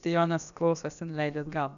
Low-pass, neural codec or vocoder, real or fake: 7.2 kHz; codec, 16 kHz, about 1 kbps, DyCAST, with the encoder's durations; fake